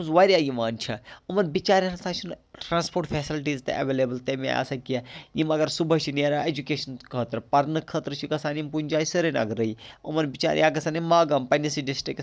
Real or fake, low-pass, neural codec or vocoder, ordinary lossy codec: real; none; none; none